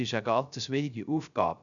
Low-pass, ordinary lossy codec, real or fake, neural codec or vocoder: 7.2 kHz; none; fake; codec, 16 kHz, 0.3 kbps, FocalCodec